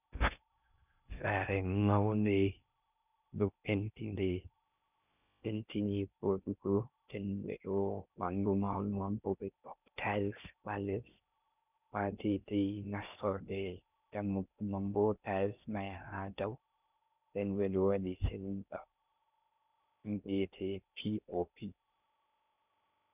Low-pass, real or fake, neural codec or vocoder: 3.6 kHz; fake; codec, 16 kHz in and 24 kHz out, 0.6 kbps, FocalCodec, streaming, 2048 codes